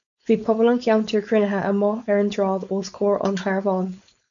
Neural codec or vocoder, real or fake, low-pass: codec, 16 kHz, 4.8 kbps, FACodec; fake; 7.2 kHz